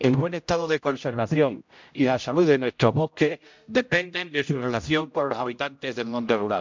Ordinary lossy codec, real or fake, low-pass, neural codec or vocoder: MP3, 64 kbps; fake; 7.2 kHz; codec, 16 kHz, 0.5 kbps, X-Codec, HuBERT features, trained on general audio